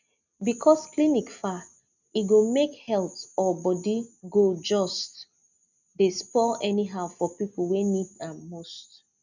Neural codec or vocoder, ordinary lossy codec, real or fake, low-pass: none; none; real; 7.2 kHz